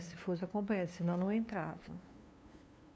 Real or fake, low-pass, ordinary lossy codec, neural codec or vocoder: fake; none; none; codec, 16 kHz, 2 kbps, FunCodec, trained on LibriTTS, 25 frames a second